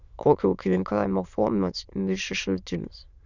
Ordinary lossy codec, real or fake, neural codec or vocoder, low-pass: Opus, 64 kbps; fake; autoencoder, 22.05 kHz, a latent of 192 numbers a frame, VITS, trained on many speakers; 7.2 kHz